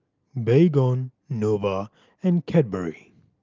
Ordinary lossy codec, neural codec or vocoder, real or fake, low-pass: Opus, 32 kbps; none; real; 7.2 kHz